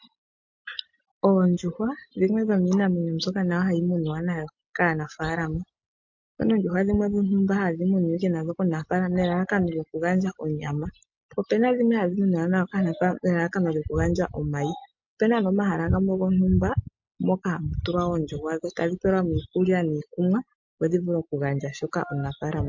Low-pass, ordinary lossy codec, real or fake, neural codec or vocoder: 7.2 kHz; MP3, 48 kbps; real; none